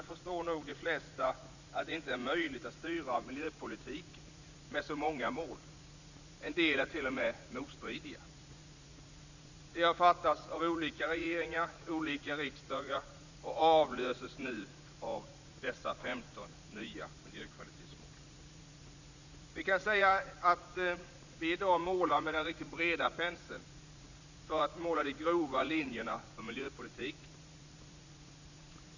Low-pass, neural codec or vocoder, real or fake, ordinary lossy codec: 7.2 kHz; vocoder, 44.1 kHz, 80 mel bands, Vocos; fake; none